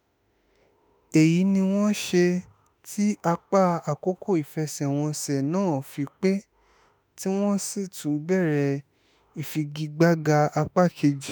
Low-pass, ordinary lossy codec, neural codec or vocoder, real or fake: none; none; autoencoder, 48 kHz, 32 numbers a frame, DAC-VAE, trained on Japanese speech; fake